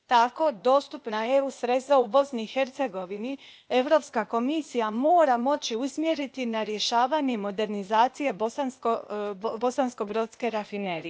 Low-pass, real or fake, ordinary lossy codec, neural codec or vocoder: none; fake; none; codec, 16 kHz, 0.8 kbps, ZipCodec